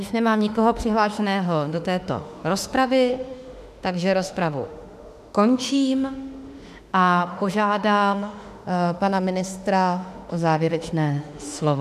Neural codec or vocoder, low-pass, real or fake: autoencoder, 48 kHz, 32 numbers a frame, DAC-VAE, trained on Japanese speech; 14.4 kHz; fake